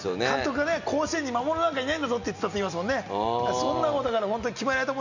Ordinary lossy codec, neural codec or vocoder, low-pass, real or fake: none; none; 7.2 kHz; real